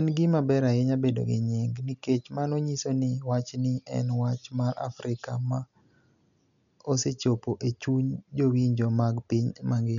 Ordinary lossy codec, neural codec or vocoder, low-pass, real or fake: none; none; 7.2 kHz; real